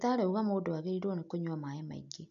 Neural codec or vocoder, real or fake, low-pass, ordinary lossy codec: none; real; 7.2 kHz; none